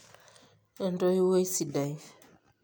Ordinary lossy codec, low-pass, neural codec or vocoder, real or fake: none; none; none; real